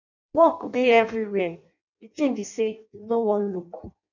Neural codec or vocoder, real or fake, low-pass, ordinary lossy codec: codec, 16 kHz in and 24 kHz out, 0.6 kbps, FireRedTTS-2 codec; fake; 7.2 kHz; none